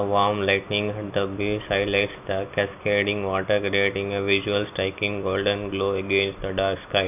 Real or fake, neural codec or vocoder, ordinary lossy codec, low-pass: real; none; none; 3.6 kHz